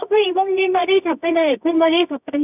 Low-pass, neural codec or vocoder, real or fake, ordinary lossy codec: 3.6 kHz; codec, 24 kHz, 0.9 kbps, WavTokenizer, medium music audio release; fake; none